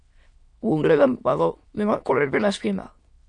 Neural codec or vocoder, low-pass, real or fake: autoencoder, 22.05 kHz, a latent of 192 numbers a frame, VITS, trained on many speakers; 9.9 kHz; fake